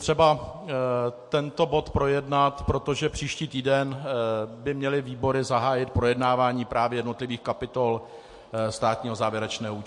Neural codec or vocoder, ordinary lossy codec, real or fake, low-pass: none; MP3, 48 kbps; real; 10.8 kHz